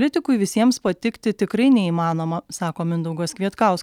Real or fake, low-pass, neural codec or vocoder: real; 19.8 kHz; none